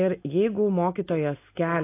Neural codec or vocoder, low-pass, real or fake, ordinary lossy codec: none; 3.6 kHz; real; AAC, 24 kbps